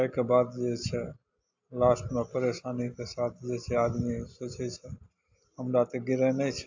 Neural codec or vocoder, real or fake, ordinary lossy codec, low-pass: none; real; AAC, 48 kbps; 7.2 kHz